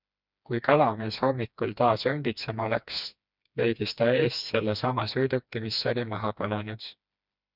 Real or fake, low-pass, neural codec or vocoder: fake; 5.4 kHz; codec, 16 kHz, 2 kbps, FreqCodec, smaller model